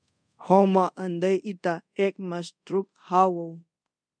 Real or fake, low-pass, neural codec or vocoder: fake; 9.9 kHz; codec, 24 kHz, 0.5 kbps, DualCodec